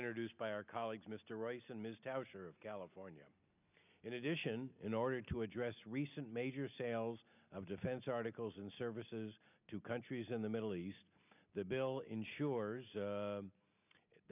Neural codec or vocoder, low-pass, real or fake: none; 3.6 kHz; real